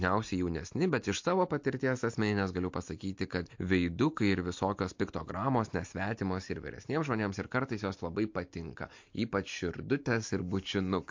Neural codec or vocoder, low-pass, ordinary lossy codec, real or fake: none; 7.2 kHz; MP3, 48 kbps; real